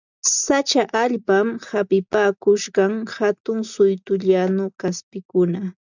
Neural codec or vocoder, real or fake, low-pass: none; real; 7.2 kHz